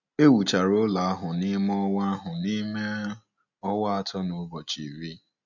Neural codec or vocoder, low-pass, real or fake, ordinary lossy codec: none; 7.2 kHz; real; none